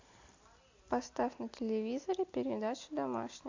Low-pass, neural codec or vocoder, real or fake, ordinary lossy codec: 7.2 kHz; none; real; AAC, 48 kbps